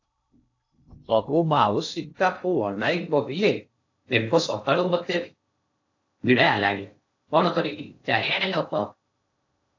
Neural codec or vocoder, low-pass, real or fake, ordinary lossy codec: codec, 16 kHz in and 24 kHz out, 0.6 kbps, FocalCodec, streaming, 2048 codes; 7.2 kHz; fake; AAC, 48 kbps